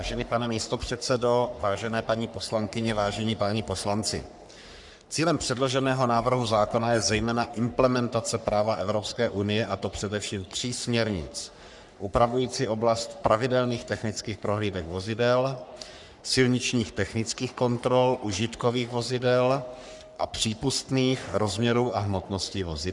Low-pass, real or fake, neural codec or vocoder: 10.8 kHz; fake; codec, 44.1 kHz, 3.4 kbps, Pupu-Codec